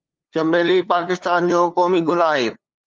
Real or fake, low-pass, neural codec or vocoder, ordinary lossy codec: fake; 7.2 kHz; codec, 16 kHz, 2 kbps, FunCodec, trained on LibriTTS, 25 frames a second; Opus, 32 kbps